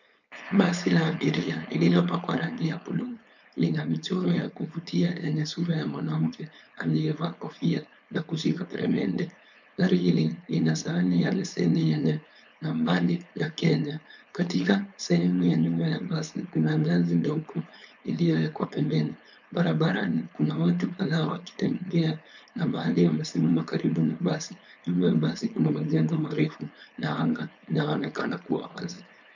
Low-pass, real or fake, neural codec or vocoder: 7.2 kHz; fake; codec, 16 kHz, 4.8 kbps, FACodec